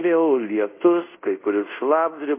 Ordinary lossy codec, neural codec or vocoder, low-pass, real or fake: MP3, 24 kbps; codec, 24 kHz, 0.5 kbps, DualCodec; 3.6 kHz; fake